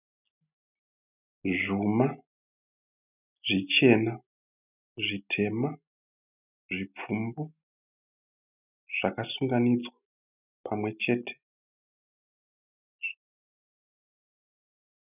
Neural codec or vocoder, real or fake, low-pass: none; real; 3.6 kHz